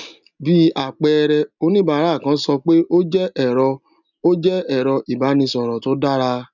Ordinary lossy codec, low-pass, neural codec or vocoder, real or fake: none; 7.2 kHz; none; real